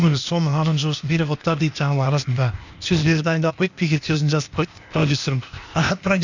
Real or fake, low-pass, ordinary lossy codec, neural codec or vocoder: fake; 7.2 kHz; none; codec, 16 kHz, 0.8 kbps, ZipCodec